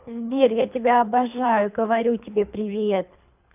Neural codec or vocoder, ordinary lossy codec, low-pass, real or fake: codec, 24 kHz, 3 kbps, HILCodec; none; 3.6 kHz; fake